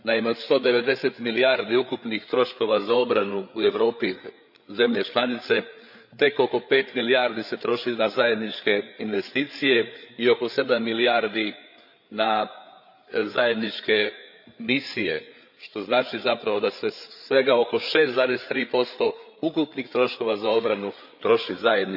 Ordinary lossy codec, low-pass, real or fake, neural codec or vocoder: none; 5.4 kHz; fake; codec, 16 kHz, 8 kbps, FreqCodec, larger model